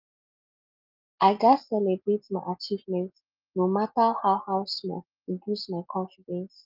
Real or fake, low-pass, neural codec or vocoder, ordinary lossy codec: real; 5.4 kHz; none; Opus, 24 kbps